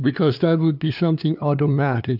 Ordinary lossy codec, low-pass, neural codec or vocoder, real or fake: AAC, 48 kbps; 5.4 kHz; codec, 16 kHz, 2 kbps, FunCodec, trained on LibriTTS, 25 frames a second; fake